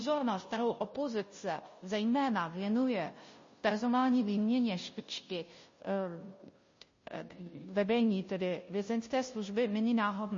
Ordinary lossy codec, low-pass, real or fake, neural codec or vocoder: MP3, 32 kbps; 7.2 kHz; fake; codec, 16 kHz, 0.5 kbps, FunCodec, trained on Chinese and English, 25 frames a second